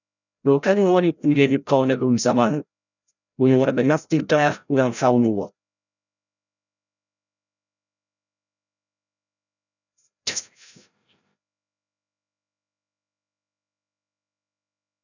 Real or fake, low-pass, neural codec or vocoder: fake; 7.2 kHz; codec, 16 kHz, 0.5 kbps, FreqCodec, larger model